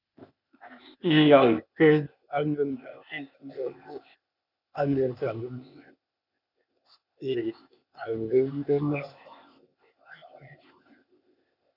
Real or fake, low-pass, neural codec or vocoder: fake; 5.4 kHz; codec, 16 kHz, 0.8 kbps, ZipCodec